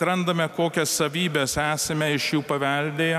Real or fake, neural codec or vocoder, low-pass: real; none; 14.4 kHz